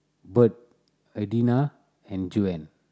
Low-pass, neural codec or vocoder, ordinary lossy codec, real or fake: none; none; none; real